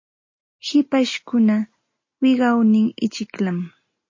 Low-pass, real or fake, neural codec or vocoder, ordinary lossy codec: 7.2 kHz; real; none; MP3, 32 kbps